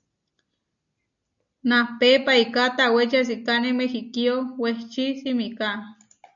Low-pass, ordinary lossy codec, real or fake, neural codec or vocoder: 7.2 kHz; MP3, 64 kbps; real; none